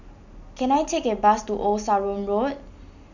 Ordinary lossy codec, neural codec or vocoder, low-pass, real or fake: none; none; 7.2 kHz; real